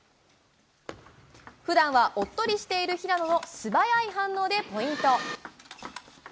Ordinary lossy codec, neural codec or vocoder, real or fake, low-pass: none; none; real; none